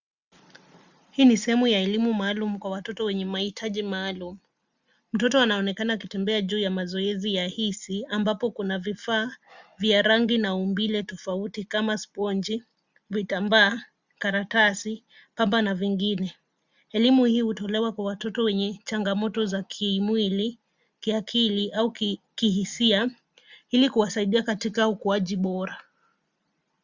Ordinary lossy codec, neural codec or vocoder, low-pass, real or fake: Opus, 64 kbps; none; 7.2 kHz; real